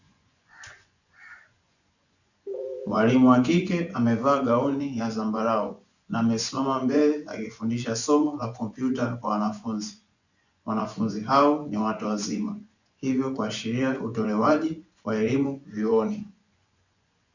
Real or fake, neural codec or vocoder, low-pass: fake; vocoder, 24 kHz, 100 mel bands, Vocos; 7.2 kHz